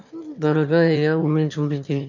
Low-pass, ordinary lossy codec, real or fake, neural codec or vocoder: 7.2 kHz; none; fake; autoencoder, 22.05 kHz, a latent of 192 numbers a frame, VITS, trained on one speaker